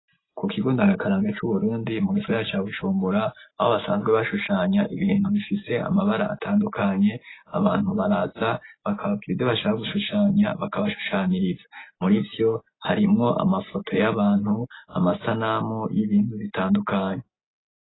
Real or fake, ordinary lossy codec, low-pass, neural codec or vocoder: real; AAC, 16 kbps; 7.2 kHz; none